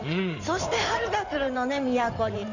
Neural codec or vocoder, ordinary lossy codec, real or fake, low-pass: codec, 16 kHz in and 24 kHz out, 2.2 kbps, FireRedTTS-2 codec; none; fake; 7.2 kHz